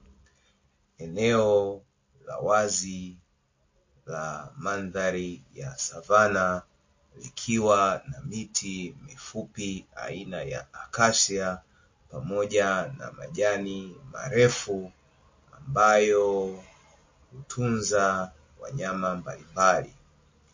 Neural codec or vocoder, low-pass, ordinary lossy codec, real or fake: none; 7.2 kHz; MP3, 32 kbps; real